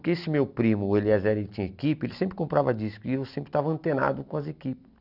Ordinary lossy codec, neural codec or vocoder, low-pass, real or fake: none; none; 5.4 kHz; real